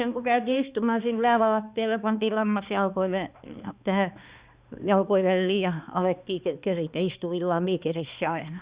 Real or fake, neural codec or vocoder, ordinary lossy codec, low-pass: fake; codec, 16 kHz, 2 kbps, X-Codec, HuBERT features, trained on balanced general audio; Opus, 64 kbps; 3.6 kHz